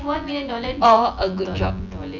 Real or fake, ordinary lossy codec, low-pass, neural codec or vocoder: fake; none; 7.2 kHz; vocoder, 24 kHz, 100 mel bands, Vocos